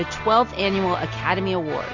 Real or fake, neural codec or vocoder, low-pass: real; none; 7.2 kHz